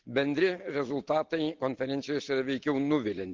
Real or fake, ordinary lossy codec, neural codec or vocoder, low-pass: real; Opus, 16 kbps; none; 7.2 kHz